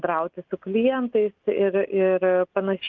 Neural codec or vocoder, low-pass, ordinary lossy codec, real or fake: none; 7.2 kHz; Opus, 24 kbps; real